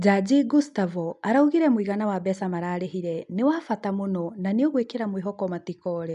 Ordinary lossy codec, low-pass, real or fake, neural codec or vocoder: AAC, 96 kbps; 10.8 kHz; real; none